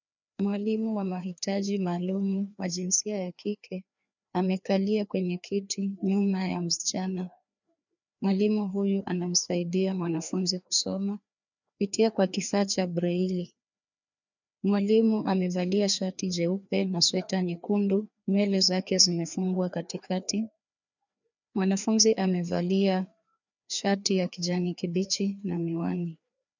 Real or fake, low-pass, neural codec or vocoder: fake; 7.2 kHz; codec, 16 kHz, 2 kbps, FreqCodec, larger model